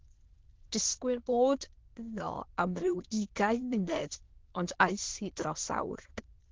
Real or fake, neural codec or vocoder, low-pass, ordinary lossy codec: fake; autoencoder, 22.05 kHz, a latent of 192 numbers a frame, VITS, trained on many speakers; 7.2 kHz; Opus, 16 kbps